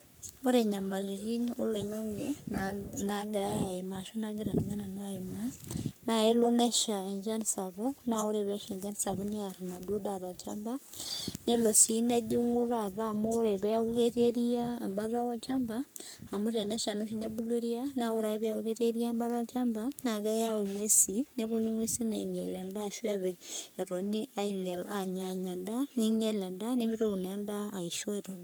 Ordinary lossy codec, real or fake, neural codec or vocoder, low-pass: none; fake; codec, 44.1 kHz, 3.4 kbps, Pupu-Codec; none